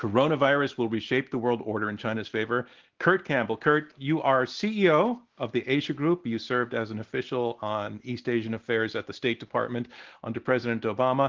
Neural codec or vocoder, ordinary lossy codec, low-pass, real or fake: none; Opus, 16 kbps; 7.2 kHz; real